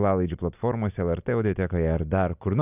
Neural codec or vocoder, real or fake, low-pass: none; real; 3.6 kHz